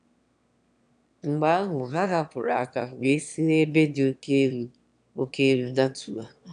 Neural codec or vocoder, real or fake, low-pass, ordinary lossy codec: autoencoder, 22.05 kHz, a latent of 192 numbers a frame, VITS, trained on one speaker; fake; 9.9 kHz; none